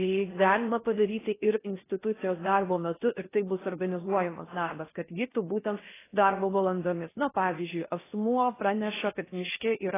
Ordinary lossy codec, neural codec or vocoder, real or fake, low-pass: AAC, 16 kbps; codec, 16 kHz in and 24 kHz out, 0.6 kbps, FocalCodec, streaming, 4096 codes; fake; 3.6 kHz